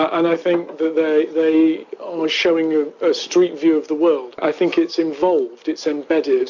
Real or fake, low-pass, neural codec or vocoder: real; 7.2 kHz; none